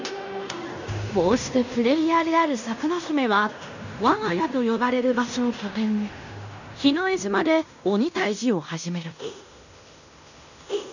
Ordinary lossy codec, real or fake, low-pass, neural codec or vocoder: none; fake; 7.2 kHz; codec, 16 kHz in and 24 kHz out, 0.9 kbps, LongCat-Audio-Codec, fine tuned four codebook decoder